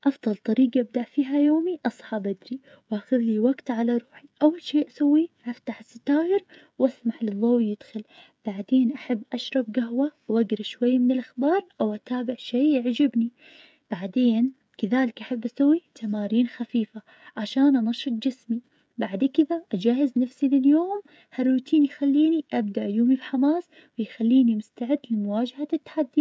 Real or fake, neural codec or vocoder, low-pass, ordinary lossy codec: fake; codec, 16 kHz, 16 kbps, FreqCodec, smaller model; none; none